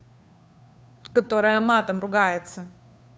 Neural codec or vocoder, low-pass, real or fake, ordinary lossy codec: codec, 16 kHz, 2 kbps, FunCodec, trained on Chinese and English, 25 frames a second; none; fake; none